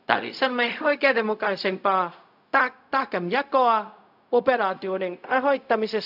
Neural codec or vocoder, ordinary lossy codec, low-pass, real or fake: codec, 16 kHz, 0.4 kbps, LongCat-Audio-Codec; AAC, 48 kbps; 5.4 kHz; fake